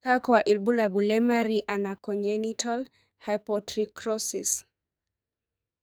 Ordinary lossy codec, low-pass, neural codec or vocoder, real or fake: none; none; codec, 44.1 kHz, 2.6 kbps, SNAC; fake